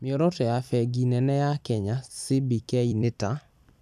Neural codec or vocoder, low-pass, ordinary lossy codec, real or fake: vocoder, 44.1 kHz, 128 mel bands every 256 samples, BigVGAN v2; 14.4 kHz; none; fake